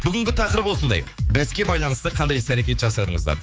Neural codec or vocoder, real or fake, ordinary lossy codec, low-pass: codec, 16 kHz, 4 kbps, X-Codec, HuBERT features, trained on general audio; fake; none; none